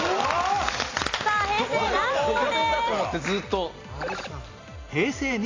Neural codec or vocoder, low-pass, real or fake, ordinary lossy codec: none; 7.2 kHz; real; AAC, 32 kbps